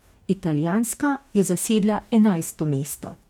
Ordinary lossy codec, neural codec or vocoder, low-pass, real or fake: none; codec, 44.1 kHz, 2.6 kbps, DAC; 19.8 kHz; fake